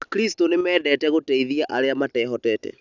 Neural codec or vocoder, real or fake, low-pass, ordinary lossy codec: none; real; 7.2 kHz; none